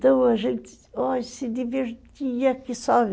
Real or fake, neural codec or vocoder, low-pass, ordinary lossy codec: real; none; none; none